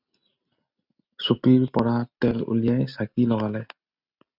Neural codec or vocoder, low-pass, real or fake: none; 5.4 kHz; real